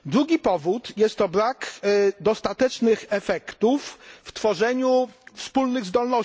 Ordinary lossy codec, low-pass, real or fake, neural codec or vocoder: none; none; real; none